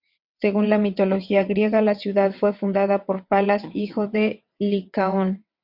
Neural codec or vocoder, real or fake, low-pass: vocoder, 22.05 kHz, 80 mel bands, WaveNeXt; fake; 5.4 kHz